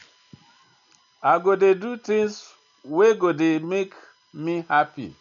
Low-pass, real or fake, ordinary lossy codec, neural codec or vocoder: 7.2 kHz; real; none; none